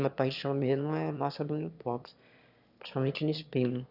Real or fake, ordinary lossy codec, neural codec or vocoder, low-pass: fake; none; autoencoder, 22.05 kHz, a latent of 192 numbers a frame, VITS, trained on one speaker; 5.4 kHz